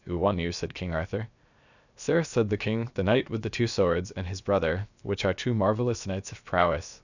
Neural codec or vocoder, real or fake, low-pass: codec, 16 kHz, 0.7 kbps, FocalCodec; fake; 7.2 kHz